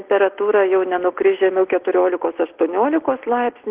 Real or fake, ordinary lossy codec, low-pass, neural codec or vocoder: real; Opus, 16 kbps; 3.6 kHz; none